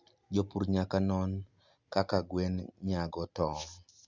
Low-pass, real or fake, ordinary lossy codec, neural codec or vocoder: 7.2 kHz; real; Opus, 64 kbps; none